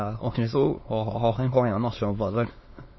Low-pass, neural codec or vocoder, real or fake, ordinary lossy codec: 7.2 kHz; autoencoder, 22.05 kHz, a latent of 192 numbers a frame, VITS, trained on many speakers; fake; MP3, 24 kbps